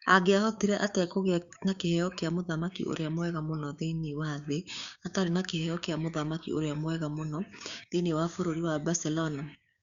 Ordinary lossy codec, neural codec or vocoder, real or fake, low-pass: Opus, 64 kbps; codec, 16 kHz, 6 kbps, DAC; fake; 7.2 kHz